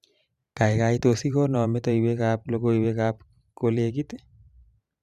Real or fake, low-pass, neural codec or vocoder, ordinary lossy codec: fake; 14.4 kHz; vocoder, 44.1 kHz, 128 mel bands every 512 samples, BigVGAN v2; Opus, 64 kbps